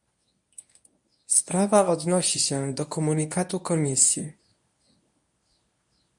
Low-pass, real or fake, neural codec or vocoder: 10.8 kHz; fake; codec, 24 kHz, 0.9 kbps, WavTokenizer, medium speech release version 1